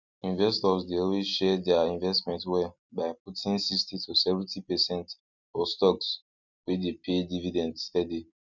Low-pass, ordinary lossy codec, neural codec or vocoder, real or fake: 7.2 kHz; none; none; real